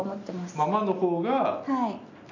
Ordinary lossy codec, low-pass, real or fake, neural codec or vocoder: none; 7.2 kHz; real; none